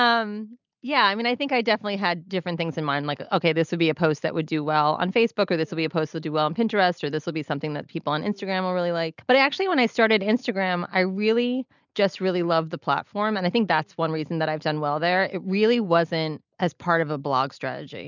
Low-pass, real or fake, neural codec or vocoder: 7.2 kHz; real; none